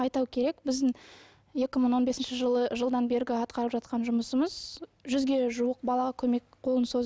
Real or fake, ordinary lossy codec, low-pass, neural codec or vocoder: real; none; none; none